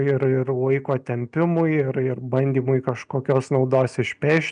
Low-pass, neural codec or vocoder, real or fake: 9.9 kHz; none; real